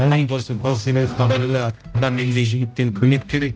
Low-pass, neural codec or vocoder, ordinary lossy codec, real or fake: none; codec, 16 kHz, 0.5 kbps, X-Codec, HuBERT features, trained on general audio; none; fake